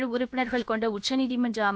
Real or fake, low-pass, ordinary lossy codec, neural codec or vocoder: fake; none; none; codec, 16 kHz, about 1 kbps, DyCAST, with the encoder's durations